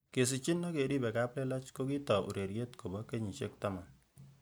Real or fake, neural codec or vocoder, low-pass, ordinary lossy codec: real; none; none; none